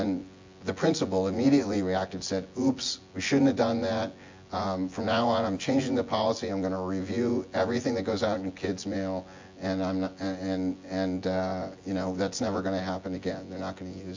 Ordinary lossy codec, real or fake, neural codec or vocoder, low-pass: MP3, 48 kbps; fake; vocoder, 24 kHz, 100 mel bands, Vocos; 7.2 kHz